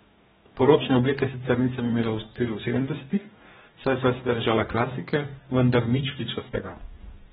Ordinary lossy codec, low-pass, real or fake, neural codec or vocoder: AAC, 16 kbps; 19.8 kHz; fake; codec, 44.1 kHz, 2.6 kbps, DAC